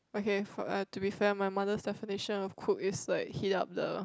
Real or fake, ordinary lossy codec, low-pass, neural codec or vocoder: real; none; none; none